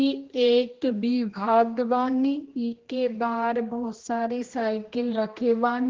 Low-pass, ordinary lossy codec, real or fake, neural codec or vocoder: 7.2 kHz; Opus, 16 kbps; fake; codec, 16 kHz, 1 kbps, X-Codec, HuBERT features, trained on general audio